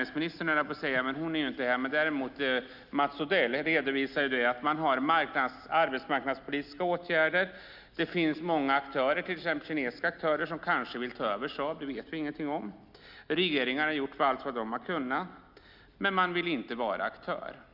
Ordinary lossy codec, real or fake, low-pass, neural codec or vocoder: Opus, 64 kbps; real; 5.4 kHz; none